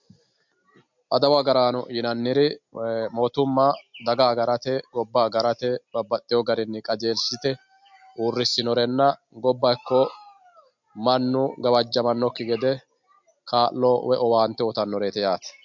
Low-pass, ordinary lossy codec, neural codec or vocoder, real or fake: 7.2 kHz; MP3, 64 kbps; none; real